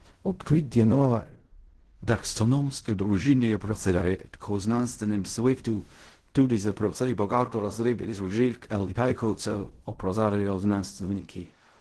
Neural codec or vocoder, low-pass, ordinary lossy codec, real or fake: codec, 16 kHz in and 24 kHz out, 0.4 kbps, LongCat-Audio-Codec, fine tuned four codebook decoder; 10.8 kHz; Opus, 16 kbps; fake